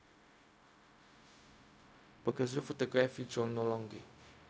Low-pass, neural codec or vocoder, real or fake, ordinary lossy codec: none; codec, 16 kHz, 0.4 kbps, LongCat-Audio-Codec; fake; none